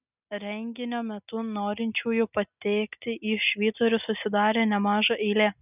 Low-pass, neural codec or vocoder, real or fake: 3.6 kHz; none; real